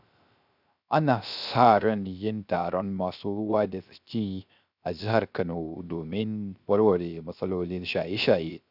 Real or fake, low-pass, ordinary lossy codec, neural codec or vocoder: fake; 5.4 kHz; none; codec, 16 kHz, 0.3 kbps, FocalCodec